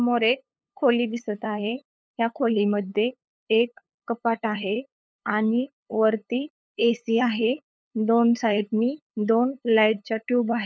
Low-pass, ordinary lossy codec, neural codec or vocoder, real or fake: none; none; codec, 16 kHz, 8 kbps, FunCodec, trained on LibriTTS, 25 frames a second; fake